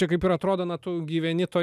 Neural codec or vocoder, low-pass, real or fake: none; 14.4 kHz; real